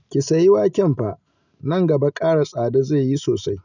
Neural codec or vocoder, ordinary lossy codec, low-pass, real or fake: none; none; 7.2 kHz; real